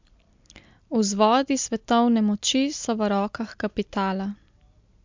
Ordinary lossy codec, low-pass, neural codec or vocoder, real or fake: MP3, 64 kbps; 7.2 kHz; none; real